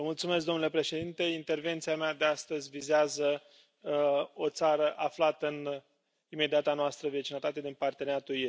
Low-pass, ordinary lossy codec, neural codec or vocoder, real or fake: none; none; none; real